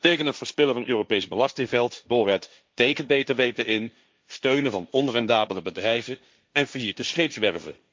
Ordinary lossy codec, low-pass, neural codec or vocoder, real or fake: none; none; codec, 16 kHz, 1.1 kbps, Voila-Tokenizer; fake